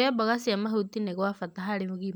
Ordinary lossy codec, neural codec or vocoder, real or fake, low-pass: none; none; real; none